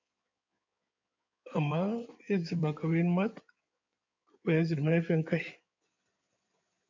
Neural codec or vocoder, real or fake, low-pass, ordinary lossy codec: codec, 16 kHz in and 24 kHz out, 2.2 kbps, FireRedTTS-2 codec; fake; 7.2 kHz; MP3, 64 kbps